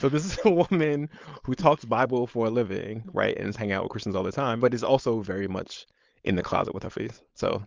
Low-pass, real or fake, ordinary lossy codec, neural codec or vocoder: 7.2 kHz; fake; Opus, 32 kbps; codec, 16 kHz, 4.8 kbps, FACodec